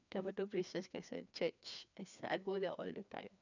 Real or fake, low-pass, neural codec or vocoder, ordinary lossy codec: fake; 7.2 kHz; codec, 16 kHz, 2 kbps, FreqCodec, larger model; none